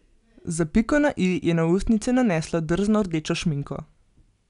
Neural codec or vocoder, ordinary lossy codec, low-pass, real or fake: none; none; 10.8 kHz; real